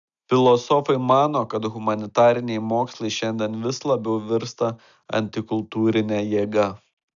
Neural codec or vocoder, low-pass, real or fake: none; 7.2 kHz; real